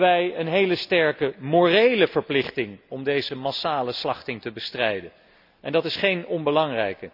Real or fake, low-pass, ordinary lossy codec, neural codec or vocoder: real; 5.4 kHz; none; none